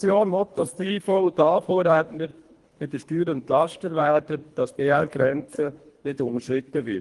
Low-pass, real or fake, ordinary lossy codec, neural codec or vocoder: 10.8 kHz; fake; Opus, 24 kbps; codec, 24 kHz, 1.5 kbps, HILCodec